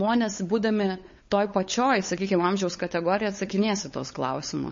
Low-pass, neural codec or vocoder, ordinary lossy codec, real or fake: 7.2 kHz; codec, 16 kHz, 8 kbps, FunCodec, trained on LibriTTS, 25 frames a second; MP3, 32 kbps; fake